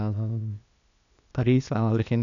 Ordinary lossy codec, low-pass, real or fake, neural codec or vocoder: none; 7.2 kHz; fake; codec, 16 kHz, 0.8 kbps, ZipCodec